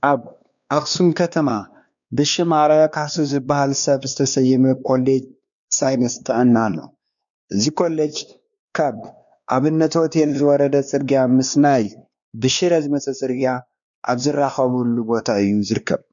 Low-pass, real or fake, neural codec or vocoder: 7.2 kHz; fake; codec, 16 kHz, 2 kbps, X-Codec, WavLM features, trained on Multilingual LibriSpeech